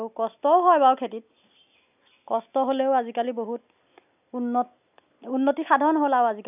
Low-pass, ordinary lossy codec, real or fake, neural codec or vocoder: 3.6 kHz; none; real; none